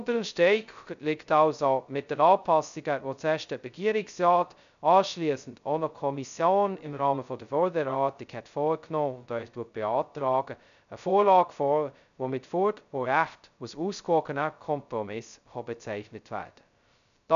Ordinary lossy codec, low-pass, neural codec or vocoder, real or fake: none; 7.2 kHz; codec, 16 kHz, 0.2 kbps, FocalCodec; fake